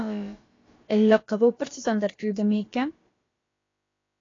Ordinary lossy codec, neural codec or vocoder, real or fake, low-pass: AAC, 32 kbps; codec, 16 kHz, about 1 kbps, DyCAST, with the encoder's durations; fake; 7.2 kHz